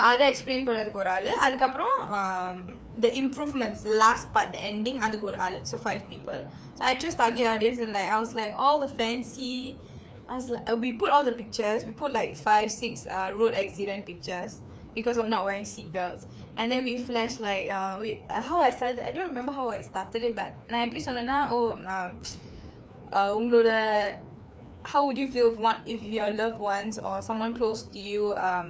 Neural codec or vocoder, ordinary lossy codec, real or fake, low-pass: codec, 16 kHz, 2 kbps, FreqCodec, larger model; none; fake; none